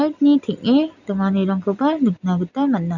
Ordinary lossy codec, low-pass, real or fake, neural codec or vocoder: none; 7.2 kHz; real; none